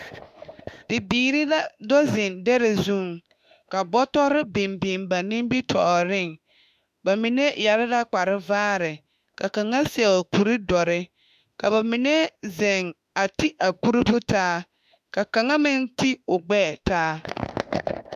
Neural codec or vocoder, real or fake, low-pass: autoencoder, 48 kHz, 32 numbers a frame, DAC-VAE, trained on Japanese speech; fake; 14.4 kHz